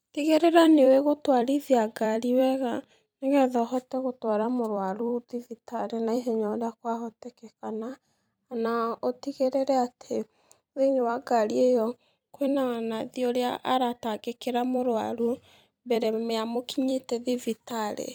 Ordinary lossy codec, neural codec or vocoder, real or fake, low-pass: none; vocoder, 44.1 kHz, 128 mel bands every 256 samples, BigVGAN v2; fake; none